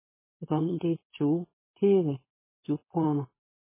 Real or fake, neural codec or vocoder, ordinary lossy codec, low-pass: fake; codec, 16 kHz, 4.8 kbps, FACodec; MP3, 16 kbps; 3.6 kHz